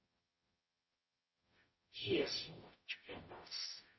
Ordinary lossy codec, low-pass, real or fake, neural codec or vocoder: MP3, 24 kbps; 7.2 kHz; fake; codec, 44.1 kHz, 0.9 kbps, DAC